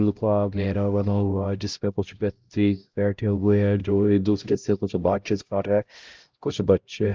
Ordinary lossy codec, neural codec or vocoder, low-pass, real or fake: Opus, 32 kbps; codec, 16 kHz, 0.5 kbps, X-Codec, HuBERT features, trained on LibriSpeech; 7.2 kHz; fake